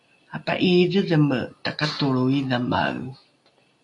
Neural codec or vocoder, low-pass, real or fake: none; 10.8 kHz; real